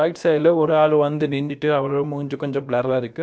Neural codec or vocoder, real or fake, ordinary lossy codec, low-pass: codec, 16 kHz, about 1 kbps, DyCAST, with the encoder's durations; fake; none; none